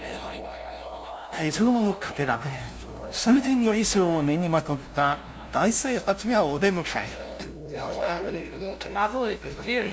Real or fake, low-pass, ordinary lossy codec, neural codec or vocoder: fake; none; none; codec, 16 kHz, 0.5 kbps, FunCodec, trained on LibriTTS, 25 frames a second